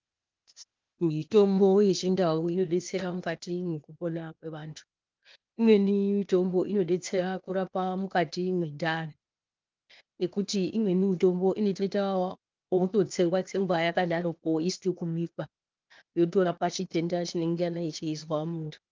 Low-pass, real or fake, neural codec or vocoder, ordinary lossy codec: 7.2 kHz; fake; codec, 16 kHz, 0.8 kbps, ZipCodec; Opus, 32 kbps